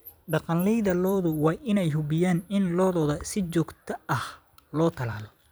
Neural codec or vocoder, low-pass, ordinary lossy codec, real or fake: vocoder, 44.1 kHz, 128 mel bands every 256 samples, BigVGAN v2; none; none; fake